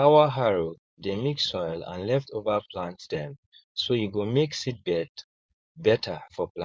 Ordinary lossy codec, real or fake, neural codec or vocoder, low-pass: none; fake; codec, 16 kHz, 4.8 kbps, FACodec; none